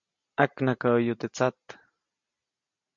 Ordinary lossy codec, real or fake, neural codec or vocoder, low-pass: MP3, 48 kbps; real; none; 7.2 kHz